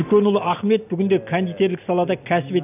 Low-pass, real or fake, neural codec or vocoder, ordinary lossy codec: 3.6 kHz; real; none; none